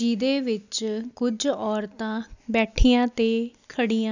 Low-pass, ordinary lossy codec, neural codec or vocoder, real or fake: 7.2 kHz; none; none; real